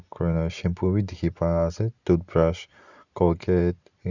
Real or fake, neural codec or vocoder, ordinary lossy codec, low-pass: real; none; none; 7.2 kHz